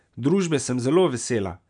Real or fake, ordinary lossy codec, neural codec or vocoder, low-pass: real; none; none; 9.9 kHz